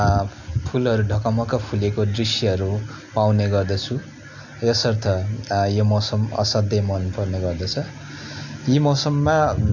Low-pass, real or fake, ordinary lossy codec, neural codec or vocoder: 7.2 kHz; real; none; none